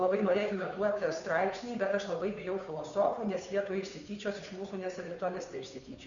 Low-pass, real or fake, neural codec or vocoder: 7.2 kHz; fake; codec, 16 kHz, 2 kbps, FunCodec, trained on Chinese and English, 25 frames a second